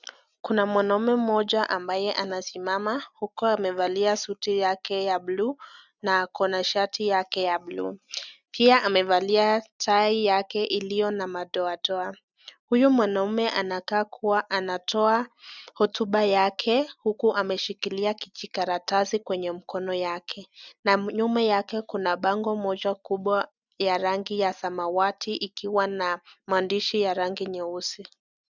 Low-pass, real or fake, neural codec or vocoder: 7.2 kHz; real; none